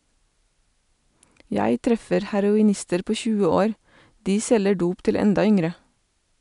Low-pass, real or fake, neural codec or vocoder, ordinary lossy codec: 10.8 kHz; real; none; none